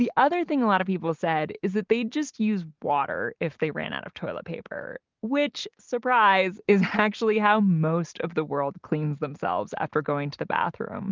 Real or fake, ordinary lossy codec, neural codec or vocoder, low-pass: real; Opus, 32 kbps; none; 7.2 kHz